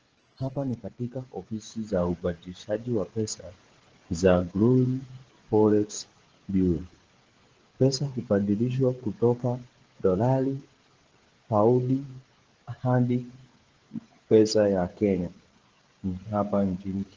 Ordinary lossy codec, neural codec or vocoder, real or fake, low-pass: Opus, 16 kbps; codec, 16 kHz, 16 kbps, FreqCodec, smaller model; fake; 7.2 kHz